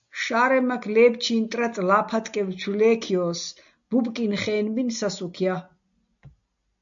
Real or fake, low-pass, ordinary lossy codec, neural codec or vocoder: real; 7.2 kHz; AAC, 64 kbps; none